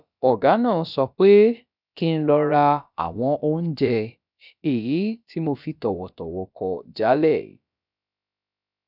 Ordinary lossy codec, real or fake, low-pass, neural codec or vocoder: none; fake; 5.4 kHz; codec, 16 kHz, about 1 kbps, DyCAST, with the encoder's durations